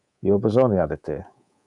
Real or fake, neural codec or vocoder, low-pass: fake; codec, 24 kHz, 3.1 kbps, DualCodec; 10.8 kHz